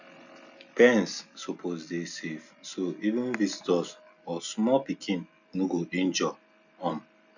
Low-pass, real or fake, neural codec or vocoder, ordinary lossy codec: 7.2 kHz; real; none; none